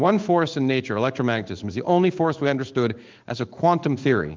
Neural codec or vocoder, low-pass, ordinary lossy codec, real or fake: codec, 16 kHz in and 24 kHz out, 1 kbps, XY-Tokenizer; 7.2 kHz; Opus, 32 kbps; fake